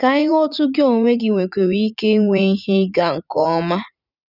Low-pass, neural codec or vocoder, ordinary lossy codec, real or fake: 5.4 kHz; vocoder, 44.1 kHz, 80 mel bands, Vocos; none; fake